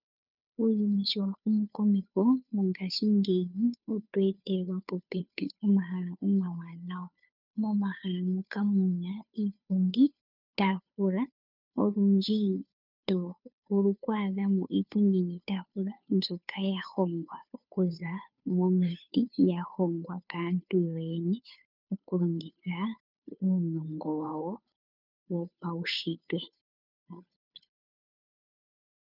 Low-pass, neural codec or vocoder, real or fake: 5.4 kHz; codec, 16 kHz, 2 kbps, FunCodec, trained on Chinese and English, 25 frames a second; fake